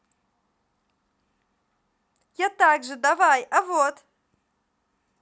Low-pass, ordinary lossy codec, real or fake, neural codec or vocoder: none; none; real; none